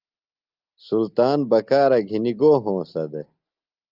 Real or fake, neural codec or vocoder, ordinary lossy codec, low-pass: real; none; Opus, 32 kbps; 5.4 kHz